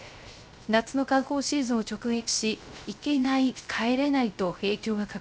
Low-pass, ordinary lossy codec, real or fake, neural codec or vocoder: none; none; fake; codec, 16 kHz, 0.3 kbps, FocalCodec